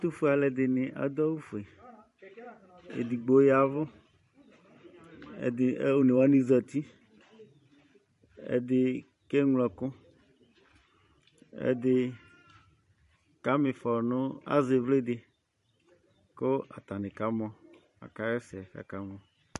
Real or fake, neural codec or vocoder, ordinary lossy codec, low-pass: fake; vocoder, 44.1 kHz, 128 mel bands every 512 samples, BigVGAN v2; MP3, 48 kbps; 14.4 kHz